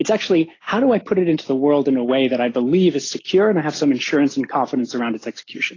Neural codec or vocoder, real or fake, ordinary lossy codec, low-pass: none; real; AAC, 32 kbps; 7.2 kHz